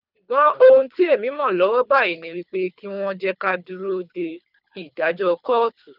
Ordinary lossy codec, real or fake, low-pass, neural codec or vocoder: none; fake; 5.4 kHz; codec, 24 kHz, 3 kbps, HILCodec